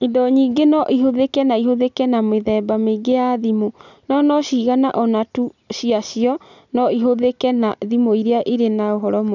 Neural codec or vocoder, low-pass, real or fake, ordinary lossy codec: none; 7.2 kHz; real; none